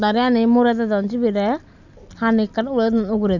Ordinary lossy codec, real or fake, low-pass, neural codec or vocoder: none; real; 7.2 kHz; none